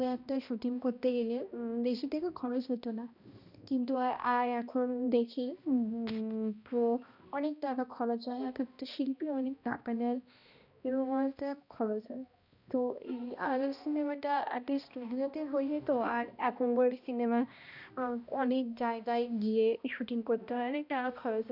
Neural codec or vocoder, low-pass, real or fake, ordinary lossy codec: codec, 16 kHz, 1 kbps, X-Codec, HuBERT features, trained on balanced general audio; 5.4 kHz; fake; none